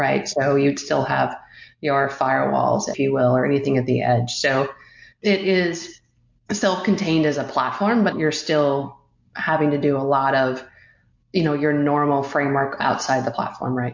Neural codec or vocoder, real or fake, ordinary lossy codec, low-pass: none; real; MP3, 48 kbps; 7.2 kHz